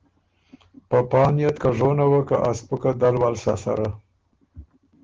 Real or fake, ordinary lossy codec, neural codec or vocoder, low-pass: real; Opus, 16 kbps; none; 7.2 kHz